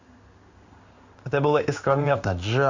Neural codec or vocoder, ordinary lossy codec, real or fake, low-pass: codec, 16 kHz in and 24 kHz out, 1 kbps, XY-Tokenizer; Opus, 64 kbps; fake; 7.2 kHz